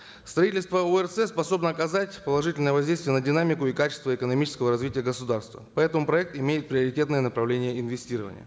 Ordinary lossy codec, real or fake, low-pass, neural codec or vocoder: none; real; none; none